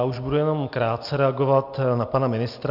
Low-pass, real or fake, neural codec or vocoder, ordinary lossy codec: 5.4 kHz; real; none; AAC, 48 kbps